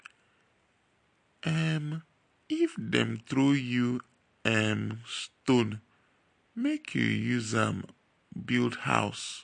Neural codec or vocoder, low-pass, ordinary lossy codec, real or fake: none; 9.9 kHz; MP3, 48 kbps; real